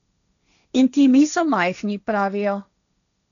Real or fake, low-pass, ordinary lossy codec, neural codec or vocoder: fake; 7.2 kHz; none; codec, 16 kHz, 1.1 kbps, Voila-Tokenizer